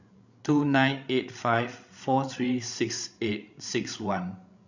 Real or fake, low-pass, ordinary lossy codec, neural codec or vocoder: fake; 7.2 kHz; none; codec, 16 kHz, 16 kbps, FreqCodec, larger model